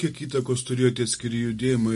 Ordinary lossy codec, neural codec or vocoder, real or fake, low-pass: MP3, 48 kbps; none; real; 14.4 kHz